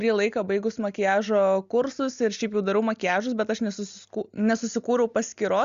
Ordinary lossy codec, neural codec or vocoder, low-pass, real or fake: Opus, 64 kbps; none; 7.2 kHz; real